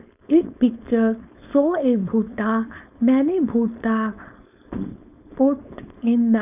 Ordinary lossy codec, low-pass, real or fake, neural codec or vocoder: none; 3.6 kHz; fake; codec, 16 kHz, 4.8 kbps, FACodec